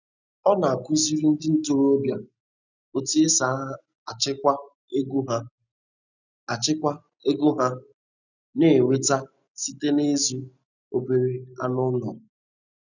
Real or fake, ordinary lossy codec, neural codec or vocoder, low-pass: real; none; none; 7.2 kHz